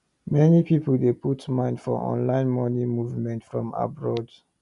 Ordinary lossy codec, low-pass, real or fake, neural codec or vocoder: none; 10.8 kHz; real; none